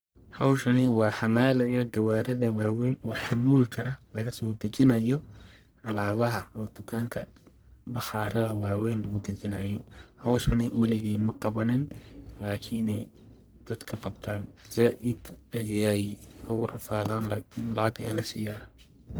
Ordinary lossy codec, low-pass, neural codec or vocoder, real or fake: none; none; codec, 44.1 kHz, 1.7 kbps, Pupu-Codec; fake